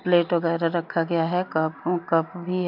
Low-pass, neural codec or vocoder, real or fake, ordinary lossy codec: 5.4 kHz; none; real; MP3, 48 kbps